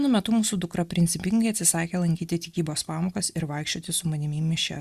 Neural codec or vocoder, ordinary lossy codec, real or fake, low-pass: none; Opus, 64 kbps; real; 14.4 kHz